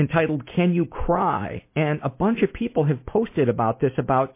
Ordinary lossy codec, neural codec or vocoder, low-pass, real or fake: MP3, 24 kbps; none; 3.6 kHz; real